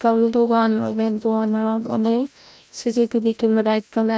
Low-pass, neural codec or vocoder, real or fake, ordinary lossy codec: none; codec, 16 kHz, 0.5 kbps, FreqCodec, larger model; fake; none